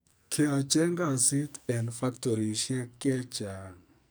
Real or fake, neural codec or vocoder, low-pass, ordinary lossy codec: fake; codec, 44.1 kHz, 2.6 kbps, SNAC; none; none